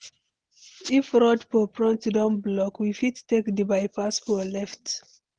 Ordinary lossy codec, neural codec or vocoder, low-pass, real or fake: Opus, 16 kbps; none; 9.9 kHz; real